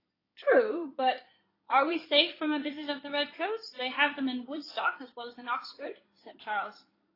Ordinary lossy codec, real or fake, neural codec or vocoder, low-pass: AAC, 24 kbps; fake; codec, 16 kHz in and 24 kHz out, 2.2 kbps, FireRedTTS-2 codec; 5.4 kHz